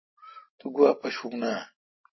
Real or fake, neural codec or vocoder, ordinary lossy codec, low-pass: real; none; MP3, 24 kbps; 7.2 kHz